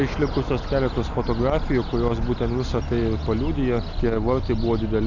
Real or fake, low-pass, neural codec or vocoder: real; 7.2 kHz; none